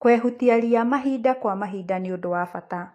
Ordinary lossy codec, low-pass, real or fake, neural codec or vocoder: AAC, 48 kbps; 14.4 kHz; fake; autoencoder, 48 kHz, 128 numbers a frame, DAC-VAE, trained on Japanese speech